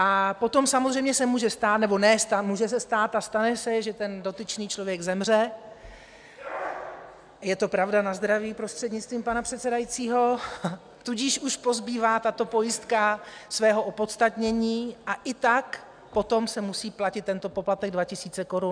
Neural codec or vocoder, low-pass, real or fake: none; 9.9 kHz; real